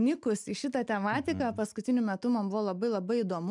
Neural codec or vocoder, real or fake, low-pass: none; real; 10.8 kHz